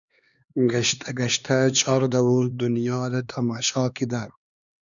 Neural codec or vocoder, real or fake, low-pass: codec, 16 kHz, 2 kbps, X-Codec, HuBERT features, trained on LibriSpeech; fake; 7.2 kHz